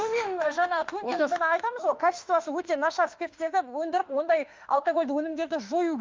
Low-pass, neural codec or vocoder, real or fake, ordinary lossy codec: 7.2 kHz; autoencoder, 48 kHz, 32 numbers a frame, DAC-VAE, trained on Japanese speech; fake; Opus, 32 kbps